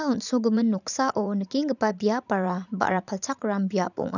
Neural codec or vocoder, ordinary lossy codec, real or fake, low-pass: vocoder, 44.1 kHz, 80 mel bands, Vocos; none; fake; 7.2 kHz